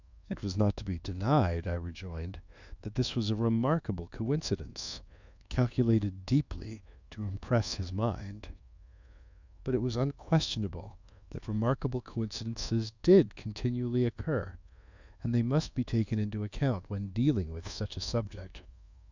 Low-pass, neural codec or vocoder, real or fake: 7.2 kHz; codec, 24 kHz, 1.2 kbps, DualCodec; fake